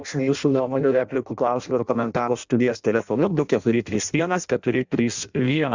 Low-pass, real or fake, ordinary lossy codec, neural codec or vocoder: 7.2 kHz; fake; Opus, 64 kbps; codec, 16 kHz in and 24 kHz out, 0.6 kbps, FireRedTTS-2 codec